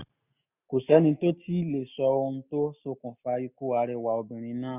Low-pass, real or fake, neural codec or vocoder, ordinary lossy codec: 3.6 kHz; real; none; none